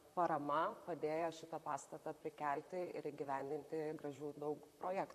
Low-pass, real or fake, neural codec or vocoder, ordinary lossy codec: 14.4 kHz; fake; vocoder, 44.1 kHz, 128 mel bands, Pupu-Vocoder; AAC, 96 kbps